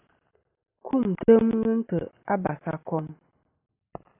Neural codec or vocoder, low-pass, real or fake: none; 3.6 kHz; real